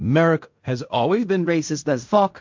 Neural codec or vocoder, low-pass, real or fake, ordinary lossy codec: codec, 16 kHz in and 24 kHz out, 0.4 kbps, LongCat-Audio-Codec, fine tuned four codebook decoder; 7.2 kHz; fake; MP3, 48 kbps